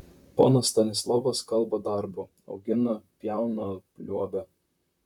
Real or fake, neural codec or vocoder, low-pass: fake; vocoder, 44.1 kHz, 128 mel bands, Pupu-Vocoder; 19.8 kHz